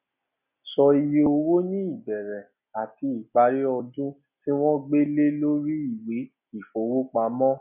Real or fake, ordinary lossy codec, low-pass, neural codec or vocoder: real; none; 3.6 kHz; none